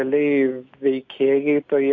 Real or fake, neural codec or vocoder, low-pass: real; none; 7.2 kHz